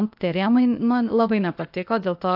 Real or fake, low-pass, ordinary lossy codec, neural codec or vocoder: fake; 5.4 kHz; AAC, 48 kbps; codec, 16 kHz, 0.8 kbps, ZipCodec